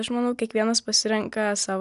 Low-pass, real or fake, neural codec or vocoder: 10.8 kHz; real; none